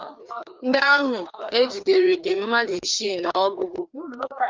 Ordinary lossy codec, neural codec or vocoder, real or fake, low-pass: Opus, 24 kbps; codec, 16 kHz, 2 kbps, FreqCodec, larger model; fake; 7.2 kHz